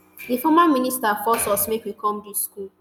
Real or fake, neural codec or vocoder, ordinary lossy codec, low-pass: real; none; none; none